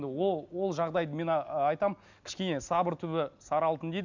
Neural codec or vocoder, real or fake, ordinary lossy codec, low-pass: none; real; none; 7.2 kHz